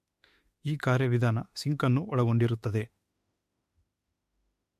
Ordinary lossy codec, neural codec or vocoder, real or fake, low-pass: MP3, 64 kbps; autoencoder, 48 kHz, 32 numbers a frame, DAC-VAE, trained on Japanese speech; fake; 14.4 kHz